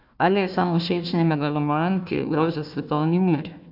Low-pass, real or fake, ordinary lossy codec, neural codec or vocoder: 5.4 kHz; fake; none; codec, 16 kHz, 1 kbps, FunCodec, trained on Chinese and English, 50 frames a second